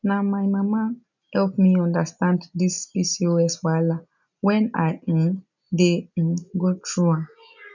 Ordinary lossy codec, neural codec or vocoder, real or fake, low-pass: none; none; real; 7.2 kHz